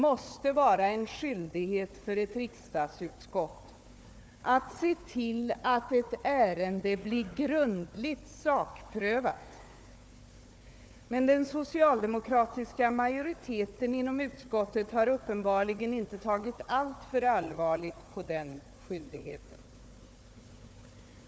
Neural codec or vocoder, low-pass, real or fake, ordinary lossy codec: codec, 16 kHz, 4 kbps, FunCodec, trained on Chinese and English, 50 frames a second; none; fake; none